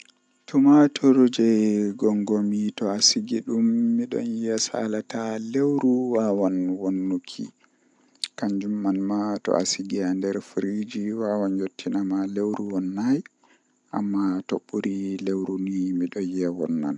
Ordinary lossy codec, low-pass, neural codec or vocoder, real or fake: none; 10.8 kHz; none; real